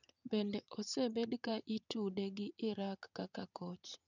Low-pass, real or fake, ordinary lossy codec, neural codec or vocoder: 7.2 kHz; fake; none; vocoder, 22.05 kHz, 80 mel bands, WaveNeXt